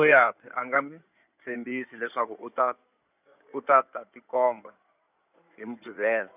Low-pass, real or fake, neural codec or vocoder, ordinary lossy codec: 3.6 kHz; fake; codec, 16 kHz in and 24 kHz out, 2.2 kbps, FireRedTTS-2 codec; none